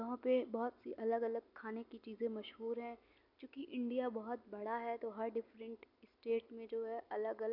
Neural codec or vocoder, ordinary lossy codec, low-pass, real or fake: none; none; 5.4 kHz; real